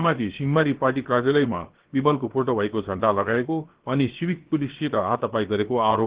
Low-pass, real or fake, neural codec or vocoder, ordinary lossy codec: 3.6 kHz; fake; codec, 16 kHz, 0.7 kbps, FocalCodec; Opus, 16 kbps